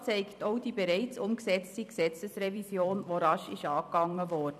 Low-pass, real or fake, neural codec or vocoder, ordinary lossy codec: 14.4 kHz; fake; vocoder, 44.1 kHz, 128 mel bands every 256 samples, BigVGAN v2; none